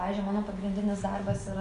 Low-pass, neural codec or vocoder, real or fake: 10.8 kHz; none; real